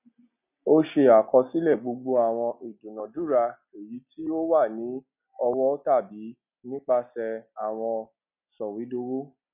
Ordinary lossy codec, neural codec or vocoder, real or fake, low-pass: none; none; real; 3.6 kHz